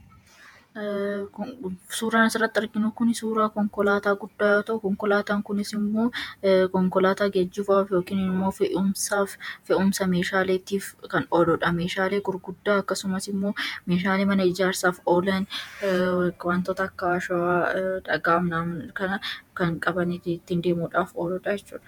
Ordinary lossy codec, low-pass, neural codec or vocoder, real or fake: MP3, 96 kbps; 19.8 kHz; vocoder, 48 kHz, 128 mel bands, Vocos; fake